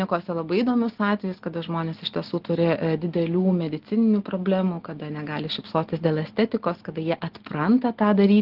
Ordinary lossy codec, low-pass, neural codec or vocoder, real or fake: Opus, 16 kbps; 5.4 kHz; none; real